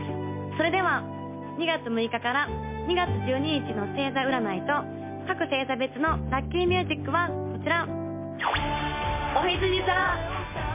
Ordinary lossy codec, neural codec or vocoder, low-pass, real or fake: MP3, 24 kbps; none; 3.6 kHz; real